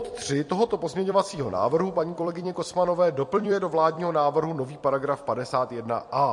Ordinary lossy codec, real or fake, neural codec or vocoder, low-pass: MP3, 48 kbps; fake; vocoder, 44.1 kHz, 128 mel bands every 256 samples, BigVGAN v2; 10.8 kHz